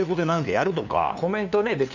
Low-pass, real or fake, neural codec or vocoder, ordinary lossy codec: 7.2 kHz; fake; codec, 16 kHz, 2 kbps, FunCodec, trained on LibriTTS, 25 frames a second; none